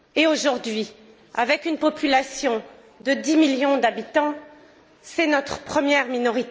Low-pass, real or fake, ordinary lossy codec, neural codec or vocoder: none; real; none; none